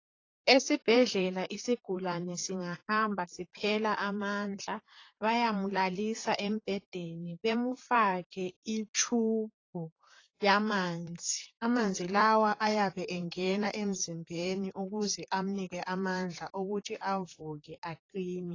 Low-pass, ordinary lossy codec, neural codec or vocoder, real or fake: 7.2 kHz; AAC, 32 kbps; vocoder, 44.1 kHz, 128 mel bands, Pupu-Vocoder; fake